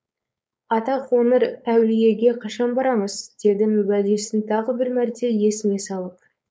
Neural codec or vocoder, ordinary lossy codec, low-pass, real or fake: codec, 16 kHz, 4.8 kbps, FACodec; none; none; fake